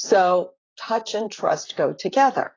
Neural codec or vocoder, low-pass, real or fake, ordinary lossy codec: vocoder, 44.1 kHz, 128 mel bands, Pupu-Vocoder; 7.2 kHz; fake; AAC, 32 kbps